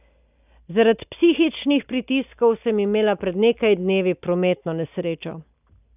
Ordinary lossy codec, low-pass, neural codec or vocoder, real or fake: none; 3.6 kHz; none; real